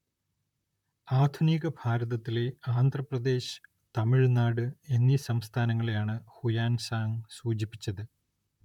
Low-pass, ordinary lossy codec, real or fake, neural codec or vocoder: 19.8 kHz; none; fake; vocoder, 44.1 kHz, 128 mel bands, Pupu-Vocoder